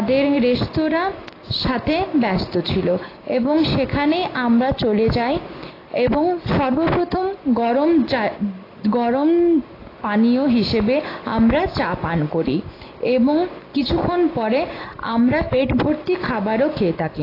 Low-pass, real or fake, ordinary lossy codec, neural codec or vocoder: 5.4 kHz; real; AAC, 24 kbps; none